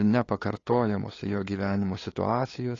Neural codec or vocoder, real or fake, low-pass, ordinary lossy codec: codec, 16 kHz, 2 kbps, FunCodec, trained on LibriTTS, 25 frames a second; fake; 7.2 kHz; AAC, 32 kbps